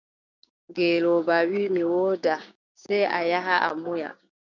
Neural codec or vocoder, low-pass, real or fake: codec, 16 kHz, 6 kbps, DAC; 7.2 kHz; fake